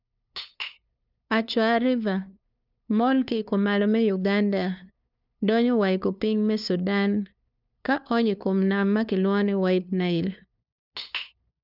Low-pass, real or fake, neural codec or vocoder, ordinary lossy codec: 5.4 kHz; fake; codec, 16 kHz, 2 kbps, FunCodec, trained on LibriTTS, 25 frames a second; none